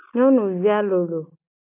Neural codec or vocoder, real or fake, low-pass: none; real; 3.6 kHz